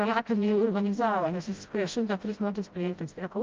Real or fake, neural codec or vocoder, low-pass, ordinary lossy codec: fake; codec, 16 kHz, 0.5 kbps, FreqCodec, smaller model; 7.2 kHz; Opus, 24 kbps